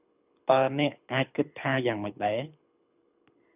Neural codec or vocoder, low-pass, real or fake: codec, 24 kHz, 6 kbps, HILCodec; 3.6 kHz; fake